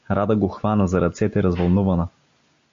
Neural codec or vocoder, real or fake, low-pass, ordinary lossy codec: none; real; 7.2 kHz; AAC, 64 kbps